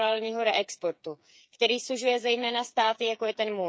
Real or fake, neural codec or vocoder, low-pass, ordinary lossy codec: fake; codec, 16 kHz, 8 kbps, FreqCodec, smaller model; none; none